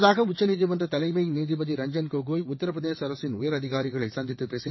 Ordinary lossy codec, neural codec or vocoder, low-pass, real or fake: MP3, 24 kbps; vocoder, 22.05 kHz, 80 mel bands, WaveNeXt; 7.2 kHz; fake